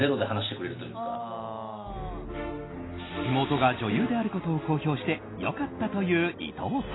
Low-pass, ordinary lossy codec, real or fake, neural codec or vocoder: 7.2 kHz; AAC, 16 kbps; real; none